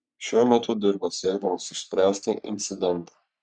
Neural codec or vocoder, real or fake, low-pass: codec, 44.1 kHz, 3.4 kbps, Pupu-Codec; fake; 9.9 kHz